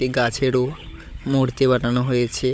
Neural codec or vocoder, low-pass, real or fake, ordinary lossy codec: codec, 16 kHz, 16 kbps, FunCodec, trained on Chinese and English, 50 frames a second; none; fake; none